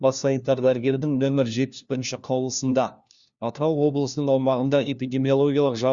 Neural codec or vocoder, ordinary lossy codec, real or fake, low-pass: codec, 16 kHz, 1 kbps, FunCodec, trained on LibriTTS, 50 frames a second; Opus, 64 kbps; fake; 7.2 kHz